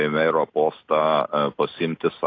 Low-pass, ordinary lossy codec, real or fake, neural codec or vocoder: 7.2 kHz; AAC, 32 kbps; real; none